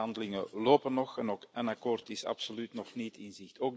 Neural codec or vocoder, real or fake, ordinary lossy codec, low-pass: none; real; none; none